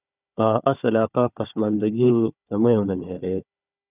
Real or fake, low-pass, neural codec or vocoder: fake; 3.6 kHz; codec, 16 kHz, 4 kbps, FunCodec, trained on Chinese and English, 50 frames a second